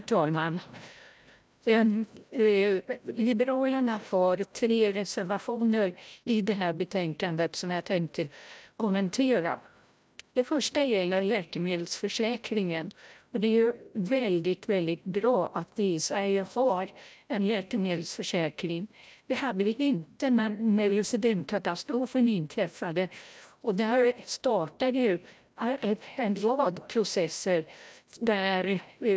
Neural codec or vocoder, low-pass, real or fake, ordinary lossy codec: codec, 16 kHz, 0.5 kbps, FreqCodec, larger model; none; fake; none